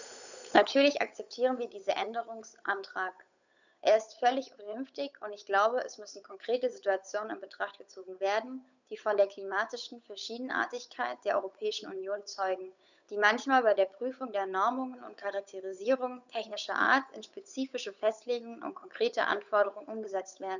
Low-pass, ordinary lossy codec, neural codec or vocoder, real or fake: 7.2 kHz; none; codec, 16 kHz, 8 kbps, FunCodec, trained on Chinese and English, 25 frames a second; fake